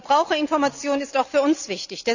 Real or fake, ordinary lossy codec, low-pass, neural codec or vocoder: real; none; 7.2 kHz; none